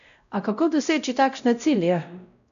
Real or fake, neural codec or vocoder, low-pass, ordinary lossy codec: fake; codec, 16 kHz, 0.5 kbps, X-Codec, WavLM features, trained on Multilingual LibriSpeech; 7.2 kHz; none